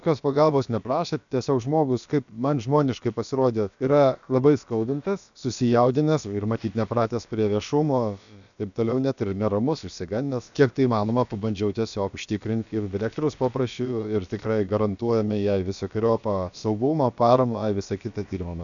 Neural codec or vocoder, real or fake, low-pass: codec, 16 kHz, about 1 kbps, DyCAST, with the encoder's durations; fake; 7.2 kHz